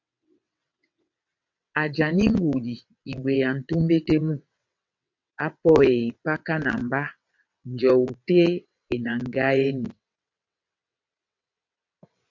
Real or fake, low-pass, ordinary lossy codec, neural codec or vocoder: fake; 7.2 kHz; MP3, 64 kbps; vocoder, 22.05 kHz, 80 mel bands, WaveNeXt